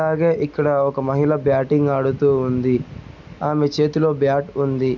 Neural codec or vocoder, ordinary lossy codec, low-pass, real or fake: none; none; 7.2 kHz; real